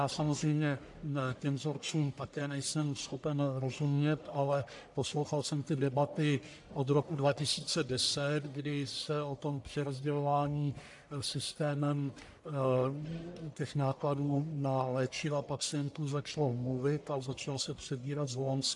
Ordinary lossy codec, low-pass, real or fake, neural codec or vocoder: AAC, 64 kbps; 10.8 kHz; fake; codec, 44.1 kHz, 1.7 kbps, Pupu-Codec